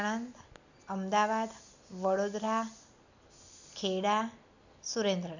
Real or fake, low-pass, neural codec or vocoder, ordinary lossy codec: real; 7.2 kHz; none; none